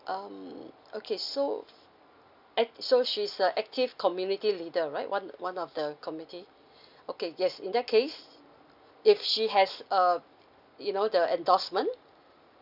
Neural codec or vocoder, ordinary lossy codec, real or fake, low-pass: none; none; real; 5.4 kHz